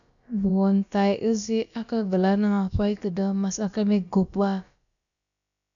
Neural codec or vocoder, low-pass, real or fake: codec, 16 kHz, about 1 kbps, DyCAST, with the encoder's durations; 7.2 kHz; fake